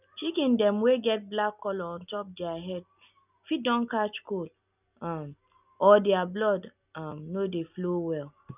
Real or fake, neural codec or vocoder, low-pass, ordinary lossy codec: real; none; 3.6 kHz; none